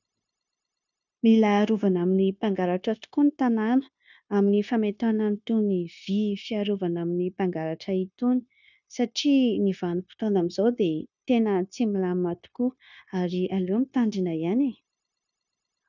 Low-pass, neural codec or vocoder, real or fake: 7.2 kHz; codec, 16 kHz, 0.9 kbps, LongCat-Audio-Codec; fake